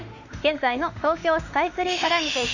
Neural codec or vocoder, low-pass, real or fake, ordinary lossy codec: autoencoder, 48 kHz, 32 numbers a frame, DAC-VAE, trained on Japanese speech; 7.2 kHz; fake; none